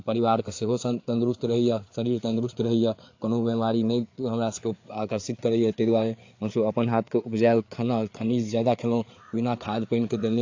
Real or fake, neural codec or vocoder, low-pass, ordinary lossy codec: fake; codec, 16 kHz, 4 kbps, FreqCodec, larger model; 7.2 kHz; AAC, 48 kbps